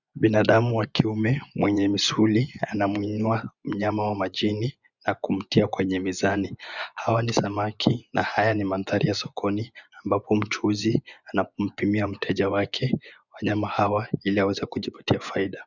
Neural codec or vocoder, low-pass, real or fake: vocoder, 24 kHz, 100 mel bands, Vocos; 7.2 kHz; fake